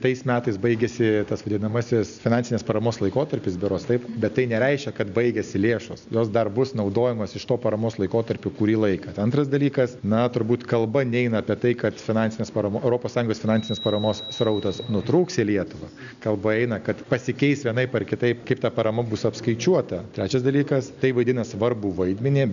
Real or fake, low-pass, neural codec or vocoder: real; 7.2 kHz; none